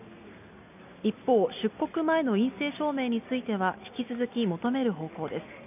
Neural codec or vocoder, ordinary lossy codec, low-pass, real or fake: none; none; 3.6 kHz; real